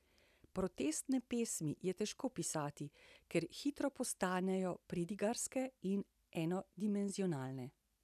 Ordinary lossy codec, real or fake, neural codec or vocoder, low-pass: none; real; none; 14.4 kHz